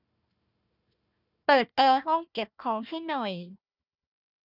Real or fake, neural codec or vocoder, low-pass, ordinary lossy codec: fake; codec, 16 kHz, 1 kbps, FunCodec, trained on Chinese and English, 50 frames a second; 5.4 kHz; none